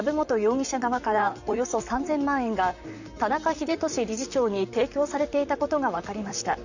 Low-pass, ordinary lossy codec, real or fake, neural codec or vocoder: 7.2 kHz; none; fake; vocoder, 44.1 kHz, 128 mel bands, Pupu-Vocoder